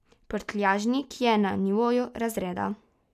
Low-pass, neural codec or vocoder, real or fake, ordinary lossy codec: 14.4 kHz; none; real; none